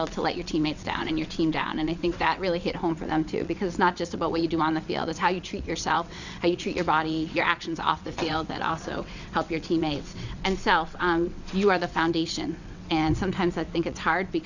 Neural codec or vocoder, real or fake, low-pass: vocoder, 22.05 kHz, 80 mel bands, WaveNeXt; fake; 7.2 kHz